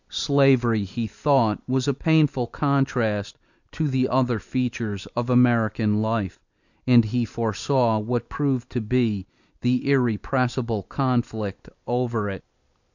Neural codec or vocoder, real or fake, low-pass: vocoder, 44.1 kHz, 128 mel bands every 256 samples, BigVGAN v2; fake; 7.2 kHz